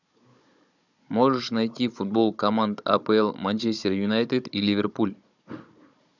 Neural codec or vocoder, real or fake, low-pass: codec, 16 kHz, 16 kbps, FunCodec, trained on Chinese and English, 50 frames a second; fake; 7.2 kHz